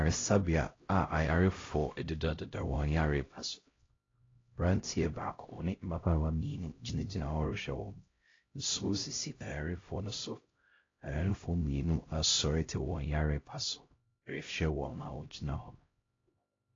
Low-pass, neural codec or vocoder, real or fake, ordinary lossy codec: 7.2 kHz; codec, 16 kHz, 0.5 kbps, X-Codec, HuBERT features, trained on LibriSpeech; fake; AAC, 32 kbps